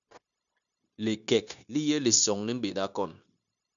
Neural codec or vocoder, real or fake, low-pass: codec, 16 kHz, 0.9 kbps, LongCat-Audio-Codec; fake; 7.2 kHz